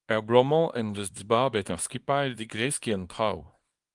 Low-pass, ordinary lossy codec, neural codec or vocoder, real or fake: 10.8 kHz; Opus, 32 kbps; codec, 24 kHz, 0.9 kbps, WavTokenizer, small release; fake